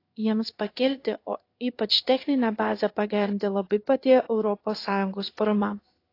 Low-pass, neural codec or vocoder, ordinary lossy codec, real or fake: 5.4 kHz; codec, 16 kHz in and 24 kHz out, 1 kbps, XY-Tokenizer; AAC, 32 kbps; fake